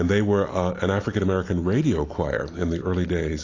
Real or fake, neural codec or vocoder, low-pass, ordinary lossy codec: real; none; 7.2 kHz; AAC, 32 kbps